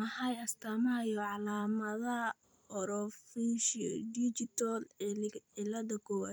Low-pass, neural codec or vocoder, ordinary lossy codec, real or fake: none; vocoder, 44.1 kHz, 128 mel bands every 256 samples, BigVGAN v2; none; fake